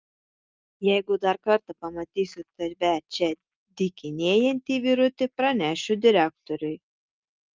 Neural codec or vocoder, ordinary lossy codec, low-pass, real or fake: none; Opus, 24 kbps; 7.2 kHz; real